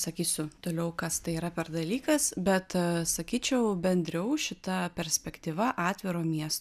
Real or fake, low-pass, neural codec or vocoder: real; 14.4 kHz; none